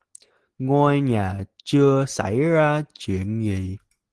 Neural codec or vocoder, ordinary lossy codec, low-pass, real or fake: none; Opus, 16 kbps; 10.8 kHz; real